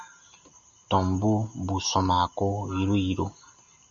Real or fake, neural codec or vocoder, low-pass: real; none; 7.2 kHz